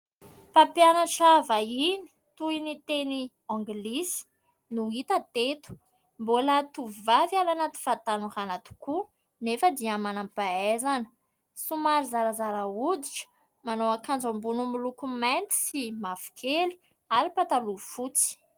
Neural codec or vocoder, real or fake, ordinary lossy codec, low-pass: none; real; Opus, 24 kbps; 19.8 kHz